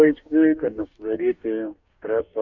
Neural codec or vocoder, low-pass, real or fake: codec, 44.1 kHz, 2.6 kbps, DAC; 7.2 kHz; fake